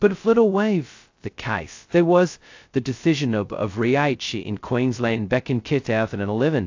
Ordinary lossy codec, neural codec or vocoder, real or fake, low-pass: AAC, 48 kbps; codec, 16 kHz, 0.2 kbps, FocalCodec; fake; 7.2 kHz